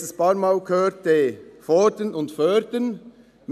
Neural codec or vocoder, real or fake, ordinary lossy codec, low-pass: none; real; none; 14.4 kHz